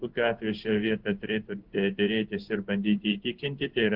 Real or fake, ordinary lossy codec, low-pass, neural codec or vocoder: fake; Opus, 16 kbps; 5.4 kHz; codec, 16 kHz in and 24 kHz out, 1 kbps, XY-Tokenizer